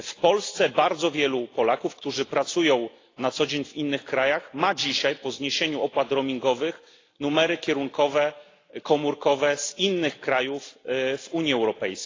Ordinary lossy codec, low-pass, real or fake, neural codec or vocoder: AAC, 32 kbps; 7.2 kHz; real; none